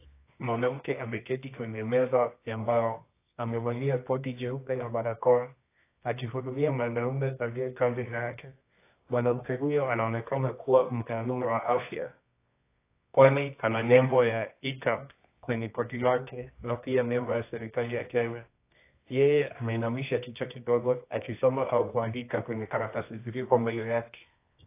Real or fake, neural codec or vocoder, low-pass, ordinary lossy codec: fake; codec, 24 kHz, 0.9 kbps, WavTokenizer, medium music audio release; 3.6 kHz; AAC, 24 kbps